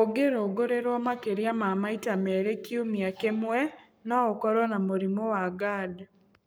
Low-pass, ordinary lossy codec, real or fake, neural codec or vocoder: none; none; fake; codec, 44.1 kHz, 7.8 kbps, Pupu-Codec